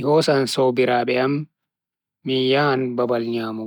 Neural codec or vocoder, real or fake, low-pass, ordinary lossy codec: none; real; 19.8 kHz; none